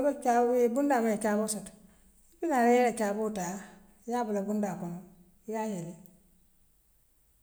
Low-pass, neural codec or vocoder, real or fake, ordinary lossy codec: none; none; real; none